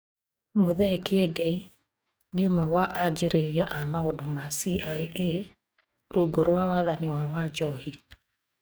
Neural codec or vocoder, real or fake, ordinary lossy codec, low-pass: codec, 44.1 kHz, 2.6 kbps, DAC; fake; none; none